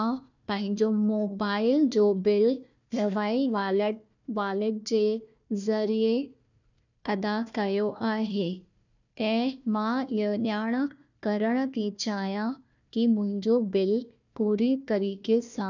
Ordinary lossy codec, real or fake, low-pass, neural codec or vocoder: none; fake; 7.2 kHz; codec, 16 kHz, 1 kbps, FunCodec, trained on Chinese and English, 50 frames a second